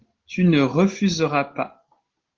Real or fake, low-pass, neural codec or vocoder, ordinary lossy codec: real; 7.2 kHz; none; Opus, 16 kbps